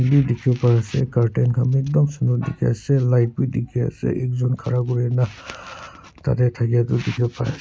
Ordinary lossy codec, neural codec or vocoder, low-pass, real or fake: none; none; none; real